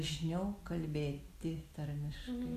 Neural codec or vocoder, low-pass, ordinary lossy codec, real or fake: none; 14.4 kHz; Opus, 64 kbps; real